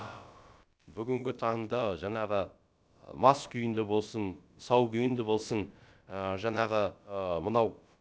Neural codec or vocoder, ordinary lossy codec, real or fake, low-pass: codec, 16 kHz, about 1 kbps, DyCAST, with the encoder's durations; none; fake; none